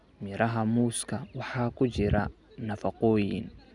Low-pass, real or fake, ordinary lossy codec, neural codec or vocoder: 10.8 kHz; real; none; none